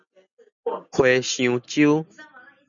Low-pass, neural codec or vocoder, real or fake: 7.2 kHz; none; real